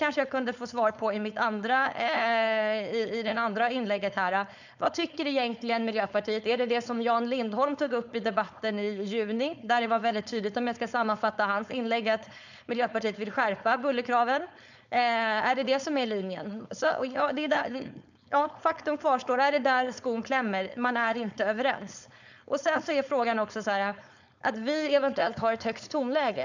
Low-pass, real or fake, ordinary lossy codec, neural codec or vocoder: 7.2 kHz; fake; none; codec, 16 kHz, 4.8 kbps, FACodec